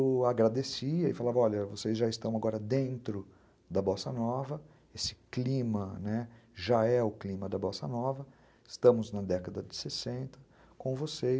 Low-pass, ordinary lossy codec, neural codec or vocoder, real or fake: none; none; none; real